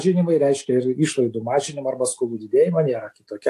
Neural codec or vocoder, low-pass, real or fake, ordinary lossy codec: none; 14.4 kHz; real; AAC, 64 kbps